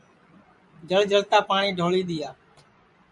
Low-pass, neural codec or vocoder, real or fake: 10.8 kHz; vocoder, 24 kHz, 100 mel bands, Vocos; fake